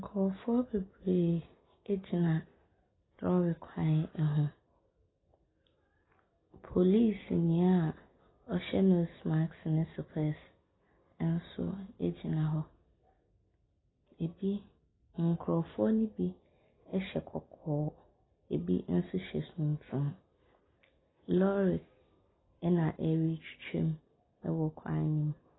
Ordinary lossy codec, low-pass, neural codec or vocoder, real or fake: AAC, 16 kbps; 7.2 kHz; none; real